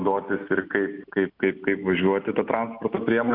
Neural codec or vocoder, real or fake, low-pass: none; real; 5.4 kHz